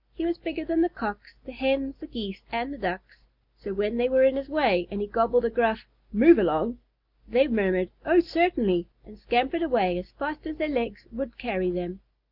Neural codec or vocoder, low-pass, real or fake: none; 5.4 kHz; real